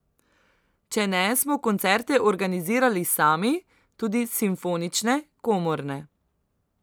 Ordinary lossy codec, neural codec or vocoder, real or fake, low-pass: none; none; real; none